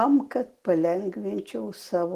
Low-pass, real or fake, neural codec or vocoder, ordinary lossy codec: 14.4 kHz; real; none; Opus, 16 kbps